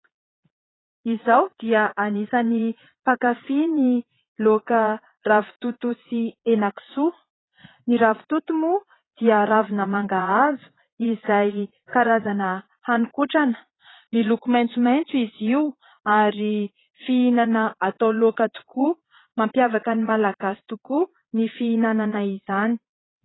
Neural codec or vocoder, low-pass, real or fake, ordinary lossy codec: vocoder, 22.05 kHz, 80 mel bands, Vocos; 7.2 kHz; fake; AAC, 16 kbps